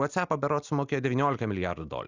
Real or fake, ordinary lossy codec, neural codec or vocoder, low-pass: real; Opus, 64 kbps; none; 7.2 kHz